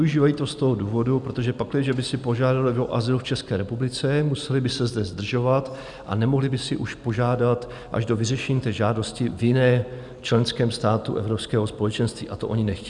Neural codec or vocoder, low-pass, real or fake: none; 10.8 kHz; real